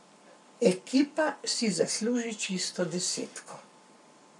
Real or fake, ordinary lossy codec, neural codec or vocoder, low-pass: fake; none; codec, 44.1 kHz, 7.8 kbps, Pupu-Codec; 10.8 kHz